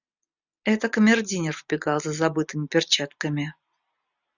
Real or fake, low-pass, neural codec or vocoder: real; 7.2 kHz; none